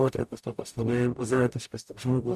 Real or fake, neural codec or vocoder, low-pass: fake; codec, 44.1 kHz, 0.9 kbps, DAC; 14.4 kHz